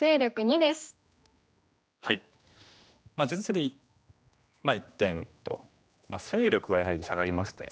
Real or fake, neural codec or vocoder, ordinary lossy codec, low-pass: fake; codec, 16 kHz, 1 kbps, X-Codec, HuBERT features, trained on general audio; none; none